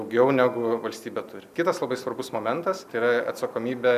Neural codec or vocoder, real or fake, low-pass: none; real; 14.4 kHz